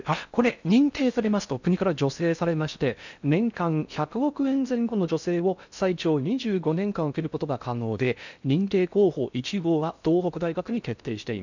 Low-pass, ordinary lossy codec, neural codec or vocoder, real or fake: 7.2 kHz; none; codec, 16 kHz in and 24 kHz out, 0.6 kbps, FocalCodec, streaming, 4096 codes; fake